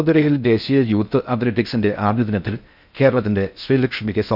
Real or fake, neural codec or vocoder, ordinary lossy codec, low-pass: fake; codec, 16 kHz in and 24 kHz out, 0.6 kbps, FocalCodec, streaming, 4096 codes; none; 5.4 kHz